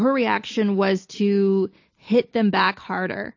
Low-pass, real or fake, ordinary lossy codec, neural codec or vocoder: 7.2 kHz; real; AAC, 48 kbps; none